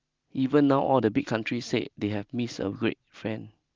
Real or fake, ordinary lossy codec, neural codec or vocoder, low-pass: real; Opus, 32 kbps; none; 7.2 kHz